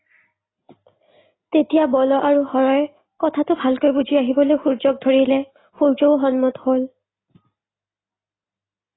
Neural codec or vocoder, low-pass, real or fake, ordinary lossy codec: none; 7.2 kHz; real; AAC, 16 kbps